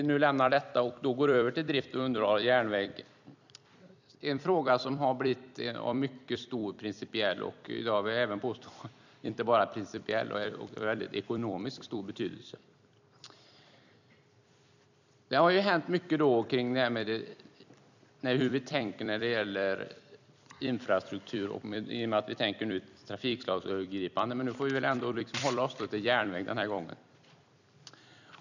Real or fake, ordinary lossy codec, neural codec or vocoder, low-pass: fake; none; vocoder, 44.1 kHz, 128 mel bands every 256 samples, BigVGAN v2; 7.2 kHz